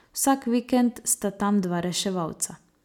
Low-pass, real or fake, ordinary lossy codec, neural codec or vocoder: 19.8 kHz; real; none; none